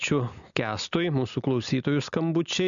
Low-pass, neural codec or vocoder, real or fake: 7.2 kHz; none; real